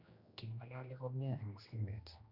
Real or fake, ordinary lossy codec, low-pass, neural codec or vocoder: fake; Opus, 64 kbps; 5.4 kHz; codec, 16 kHz, 1 kbps, X-Codec, HuBERT features, trained on general audio